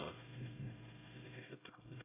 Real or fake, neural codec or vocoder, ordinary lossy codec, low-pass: fake; codec, 16 kHz, 0.5 kbps, X-Codec, HuBERT features, trained on LibriSpeech; none; 3.6 kHz